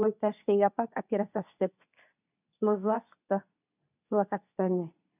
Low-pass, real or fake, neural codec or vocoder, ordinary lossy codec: 3.6 kHz; fake; codec, 24 kHz, 0.9 kbps, WavTokenizer, medium speech release version 2; none